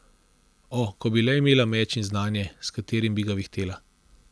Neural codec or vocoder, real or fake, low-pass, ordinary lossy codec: none; real; none; none